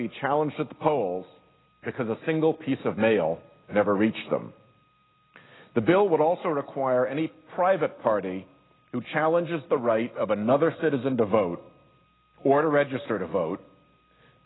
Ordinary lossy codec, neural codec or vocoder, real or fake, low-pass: AAC, 16 kbps; none; real; 7.2 kHz